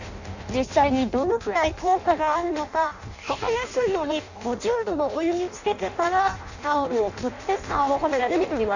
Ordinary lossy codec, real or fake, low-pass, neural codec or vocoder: none; fake; 7.2 kHz; codec, 16 kHz in and 24 kHz out, 0.6 kbps, FireRedTTS-2 codec